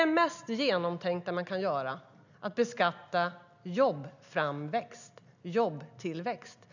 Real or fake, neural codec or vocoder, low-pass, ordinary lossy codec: real; none; 7.2 kHz; none